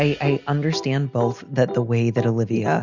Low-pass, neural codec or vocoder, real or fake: 7.2 kHz; none; real